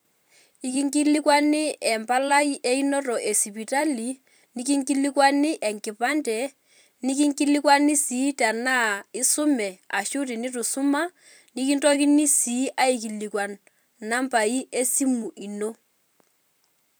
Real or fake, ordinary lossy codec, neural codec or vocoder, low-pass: fake; none; vocoder, 44.1 kHz, 128 mel bands every 256 samples, BigVGAN v2; none